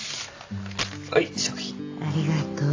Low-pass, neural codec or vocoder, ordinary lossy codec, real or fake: 7.2 kHz; none; AAC, 48 kbps; real